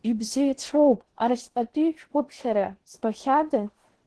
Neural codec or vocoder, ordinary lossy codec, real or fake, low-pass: codec, 16 kHz in and 24 kHz out, 0.6 kbps, FocalCodec, streaming, 2048 codes; Opus, 16 kbps; fake; 10.8 kHz